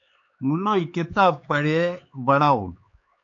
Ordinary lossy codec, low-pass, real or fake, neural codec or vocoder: AAC, 48 kbps; 7.2 kHz; fake; codec, 16 kHz, 4 kbps, X-Codec, HuBERT features, trained on LibriSpeech